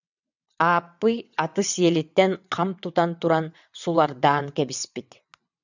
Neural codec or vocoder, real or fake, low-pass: vocoder, 22.05 kHz, 80 mel bands, WaveNeXt; fake; 7.2 kHz